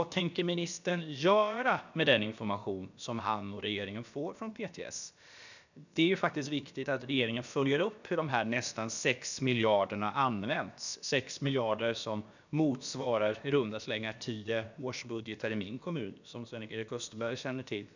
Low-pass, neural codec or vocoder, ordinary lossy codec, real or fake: 7.2 kHz; codec, 16 kHz, about 1 kbps, DyCAST, with the encoder's durations; none; fake